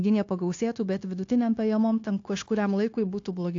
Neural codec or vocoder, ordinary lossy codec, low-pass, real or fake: codec, 16 kHz, 0.9 kbps, LongCat-Audio-Codec; AAC, 48 kbps; 7.2 kHz; fake